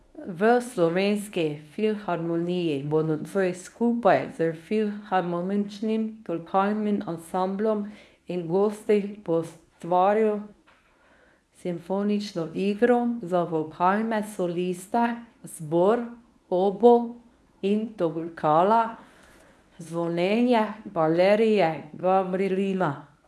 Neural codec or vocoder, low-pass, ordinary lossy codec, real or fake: codec, 24 kHz, 0.9 kbps, WavTokenizer, medium speech release version 1; none; none; fake